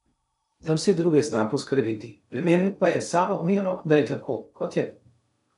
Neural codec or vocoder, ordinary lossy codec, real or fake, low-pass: codec, 16 kHz in and 24 kHz out, 0.6 kbps, FocalCodec, streaming, 2048 codes; none; fake; 10.8 kHz